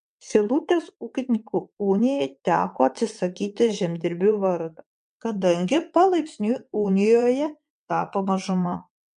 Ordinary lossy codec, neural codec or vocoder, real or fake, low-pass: MP3, 64 kbps; vocoder, 22.05 kHz, 80 mel bands, WaveNeXt; fake; 9.9 kHz